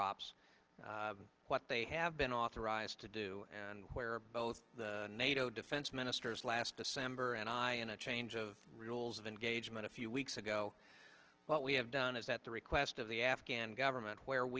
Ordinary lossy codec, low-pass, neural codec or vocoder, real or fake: Opus, 16 kbps; 7.2 kHz; none; real